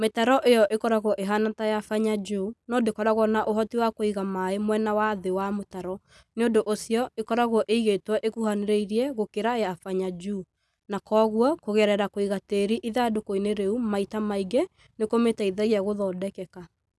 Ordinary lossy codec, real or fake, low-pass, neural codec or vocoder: none; real; none; none